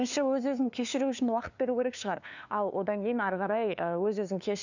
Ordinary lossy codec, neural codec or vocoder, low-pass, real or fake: none; codec, 16 kHz, 2 kbps, FunCodec, trained on LibriTTS, 25 frames a second; 7.2 kHz; fake